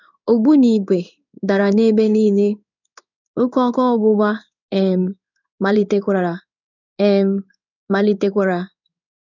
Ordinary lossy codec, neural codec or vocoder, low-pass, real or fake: none; codec, 16 kHz in and 24 kHz out, 1 kbps, XY-Tokenizer; 7.2 kHz; fake